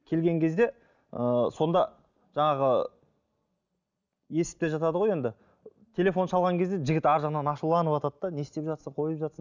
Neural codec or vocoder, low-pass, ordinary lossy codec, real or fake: none; 7.2 kHz; none; real